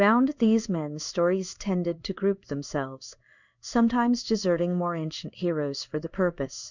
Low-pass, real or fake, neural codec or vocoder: 7.2 kHz; fake; codec, 16 kHz in and 24 kHz out, 1 kbps, XY-Tokenizer